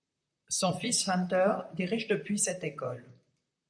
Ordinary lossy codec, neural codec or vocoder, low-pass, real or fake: MP3, 96 kbps; vocoder, 44.1 kHz, 128 mel bands, Pupu-Vocoder; 9.9 kHz; fake